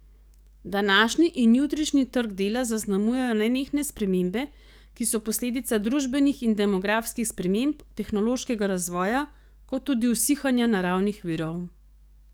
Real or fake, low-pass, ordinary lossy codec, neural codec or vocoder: fake; none; none; codec, 44.1 kHz, 7.8 kbps, DAC